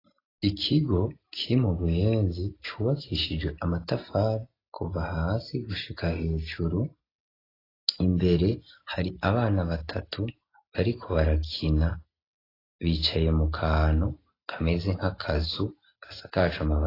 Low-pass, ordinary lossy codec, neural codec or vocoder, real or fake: 5.4 kHz; AAC, 24 kbps; none; real